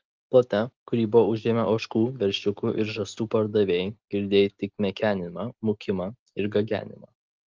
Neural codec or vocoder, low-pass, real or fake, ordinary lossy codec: none; 7.2 kHz; real; Opus, 32 kbps